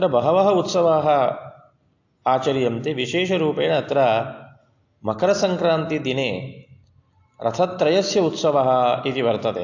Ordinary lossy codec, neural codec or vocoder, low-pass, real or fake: AAC, 48 kbps; none; 7.2 kHz; real